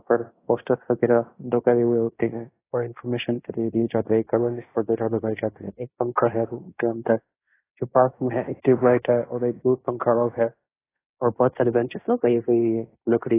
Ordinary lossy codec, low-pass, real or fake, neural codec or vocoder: AAC, 16 kbps; 3.6 kHz; fake; codec, 16 kHz in and 24 kHz out, 0.9 kbps, LongCat-Audio-Codec, fine tuned four codebook decoder